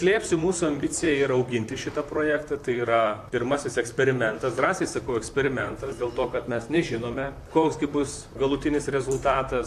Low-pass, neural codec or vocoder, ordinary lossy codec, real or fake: 14.4 kHz; vocoder, 44.1 kHz, 128 mel bands, Pupu-Vocoder; AAC, 64 kbps; fake